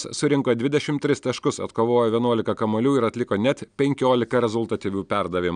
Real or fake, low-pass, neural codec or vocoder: real; 9.9 kHz; none